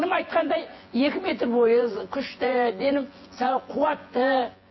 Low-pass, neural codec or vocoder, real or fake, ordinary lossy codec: 7.2 kHz; vocoder, 24 kHz, 100 mel bands, Vocos; fake; MP3, 24 kbps